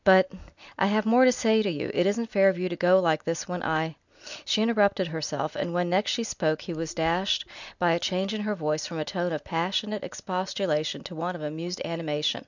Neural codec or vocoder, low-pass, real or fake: none; 7.2 kHz; real